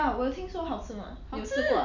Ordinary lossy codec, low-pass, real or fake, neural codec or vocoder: none; 7.2 kHz; real; none